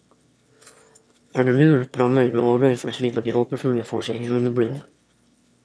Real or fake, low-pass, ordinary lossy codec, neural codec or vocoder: fake; none; none; autoencoder, 22.05 kHz, a latent of 192 numbers a frame, VITS, trained on one speaker